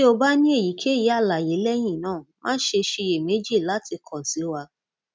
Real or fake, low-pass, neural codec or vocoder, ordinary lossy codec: real; none; none; none